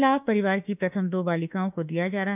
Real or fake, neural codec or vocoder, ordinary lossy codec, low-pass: fake; autoencoder, 48 kHz, 32 numbers a frame, DAC-VAE, trained on Japanese speech; none; 3.6 kHz